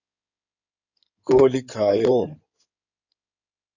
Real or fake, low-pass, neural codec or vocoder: fake; 7.2 kHz; codec, 16 kHz in and 24 kHz out, 2.2 kbps, FireRedTTS-2 codec